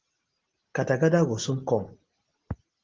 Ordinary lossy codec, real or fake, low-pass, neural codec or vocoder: Opus, 16 kbps; real; 7.2 kHz; none